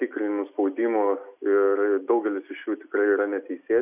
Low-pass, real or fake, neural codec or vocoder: 3.6 kHz; real; none